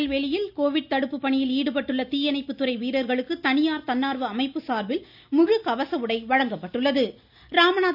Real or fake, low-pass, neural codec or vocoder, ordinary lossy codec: real; 5.4 kHz; none; none